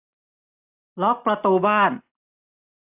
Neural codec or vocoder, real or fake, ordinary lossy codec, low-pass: none; real; AAC, 32 kbps; 3.6 kHz